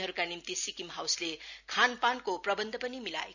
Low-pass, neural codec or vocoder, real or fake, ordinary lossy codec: 7.2 kHz; none; real; none